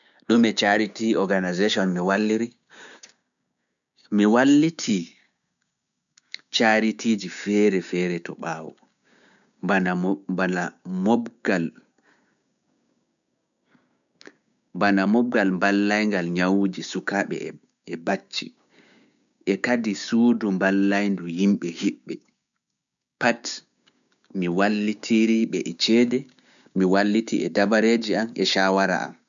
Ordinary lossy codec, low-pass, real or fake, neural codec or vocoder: none; 7.2 kHz; fake; codec, 16 kHz, 6 kbps, DAC